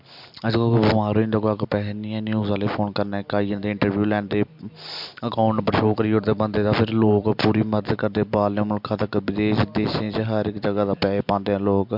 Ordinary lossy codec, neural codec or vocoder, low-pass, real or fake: MP3, 48 kbps; none; 5.4 kHz; real